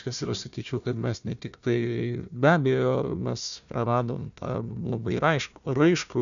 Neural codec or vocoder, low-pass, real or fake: codec, 16 kHz, 1 kbps, FunCodec, trained on Chinese and English, 50 frames a second; 7.2 kHz; fake